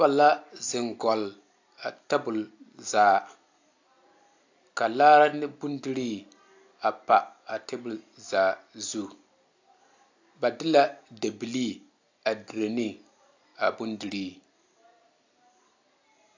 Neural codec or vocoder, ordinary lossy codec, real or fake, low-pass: none; AAC, 48 kbps; real; 7.2 kHz